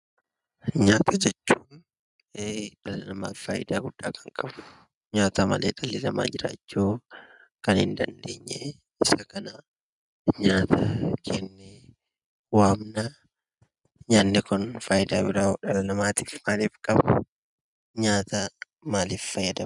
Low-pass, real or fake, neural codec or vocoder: 10.8 kHz; real; none